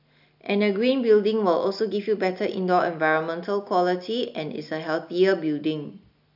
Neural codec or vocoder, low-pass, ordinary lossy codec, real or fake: none; 5.4 kHz; none; real